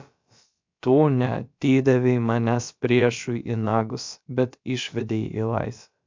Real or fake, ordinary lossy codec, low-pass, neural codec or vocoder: fake; MP3, 64 kbps; 7.2 kHz; codec, 16 kHz, about 1 kbps, DyCAST, with the encoder's durations